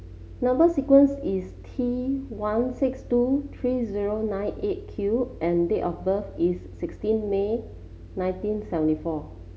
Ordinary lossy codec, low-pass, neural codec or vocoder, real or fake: none; none; none; real